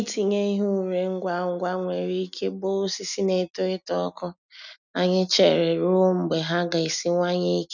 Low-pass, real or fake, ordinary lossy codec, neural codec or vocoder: 7.2 kHz; real; none; none